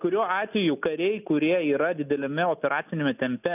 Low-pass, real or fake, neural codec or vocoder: 3.6 kHz; real; none